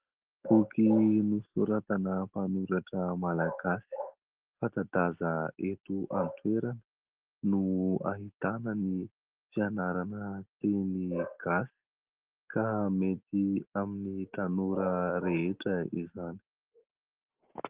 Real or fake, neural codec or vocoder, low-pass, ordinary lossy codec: real; none; 3.6 kHz; Opus, 16 kbps